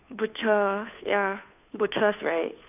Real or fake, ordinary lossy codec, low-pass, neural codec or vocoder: fake; none; 3.6 kHz; codec, 16 kHz, 2 kbps, FunCodec, trained on Chinese and English, 25 frames a second